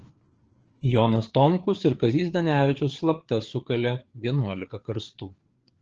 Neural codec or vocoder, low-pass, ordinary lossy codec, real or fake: codec, 16 kHz, 4 kbps, FreqCodec, larger model; 7.2 kHz; Opus, 16 kbps; fake